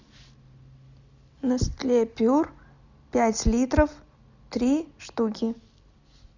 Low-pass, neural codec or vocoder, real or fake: 7.2 kHz; none; real